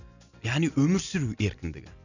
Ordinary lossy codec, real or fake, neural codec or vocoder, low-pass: none; real; none; 7.2 kHz